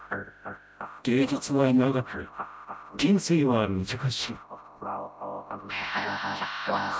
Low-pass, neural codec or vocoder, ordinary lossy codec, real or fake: none; codec, 16 kHz, 0.5 kbps, FreqCodec, smaller model; none; fake